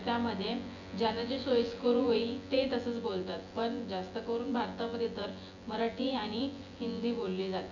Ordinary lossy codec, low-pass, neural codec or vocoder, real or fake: none; 7.2 kHz; vocoder, 24 kHz, 100 mel bands, Vocos; fake